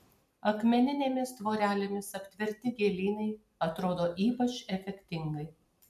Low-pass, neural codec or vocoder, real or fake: 14.4 kHz; none; real